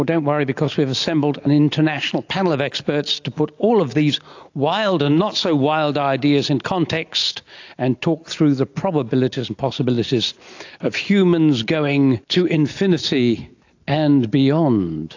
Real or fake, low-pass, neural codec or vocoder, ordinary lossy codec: real; 7.2 kHz; none; AAC, 48 kbps